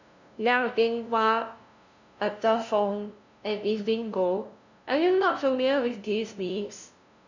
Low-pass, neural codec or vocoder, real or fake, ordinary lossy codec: 7.2 kHz; codec, 16 kHz, 0.5 kbps, FunCodec, trained on LibriTTS, 25 frames a second; fake; none